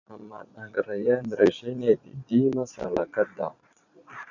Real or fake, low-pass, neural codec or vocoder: fake; 7.2 kHz; vocoder, 22.05 kHz, 80 mel bands, Vocos